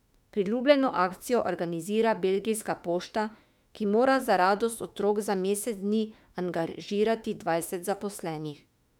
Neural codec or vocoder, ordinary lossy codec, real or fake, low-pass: autoencoder, 48 kHz, 32 numbers a frame, DAC-VAE, trained on Japanese speech; none; fake; 19.8 kHz